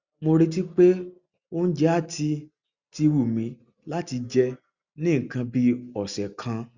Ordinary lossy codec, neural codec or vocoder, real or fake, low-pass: Opus, 64 kbps; none; real; 7.2 kHz